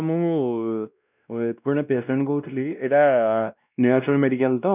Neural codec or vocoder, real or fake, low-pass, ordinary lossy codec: codec, 16 kHz, 1 kbps, X-Codec, WavLM features, trained on Multilingual LibriSpeech; fake; 3.6 kHz; none